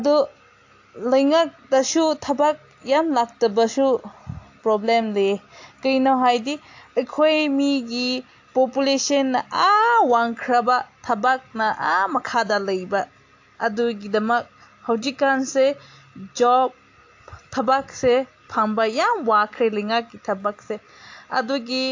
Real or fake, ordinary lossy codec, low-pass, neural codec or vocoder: real; AAC, 48 kbps; 7.2 kHz; none